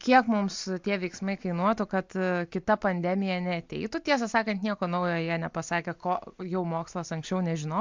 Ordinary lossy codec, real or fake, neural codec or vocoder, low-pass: MP3, 64 kbps; real; none; 7.2 kHz